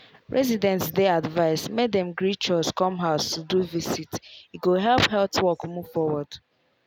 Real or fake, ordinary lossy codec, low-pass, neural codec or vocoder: real; none; none; none